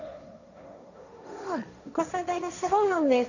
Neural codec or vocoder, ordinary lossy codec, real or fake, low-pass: codec, 16 kHz, 1.1 kbps, Voila-Tokenizer; none; fake; 7.2 kHz